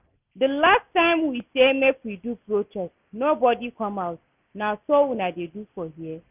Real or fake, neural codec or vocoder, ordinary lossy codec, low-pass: real; none; none; 3.6 kHz